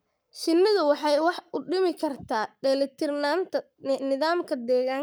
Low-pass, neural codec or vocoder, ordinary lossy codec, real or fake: none; codec, 44.1 kHz, 7.8 kbps, Pupu-Codec; none; fake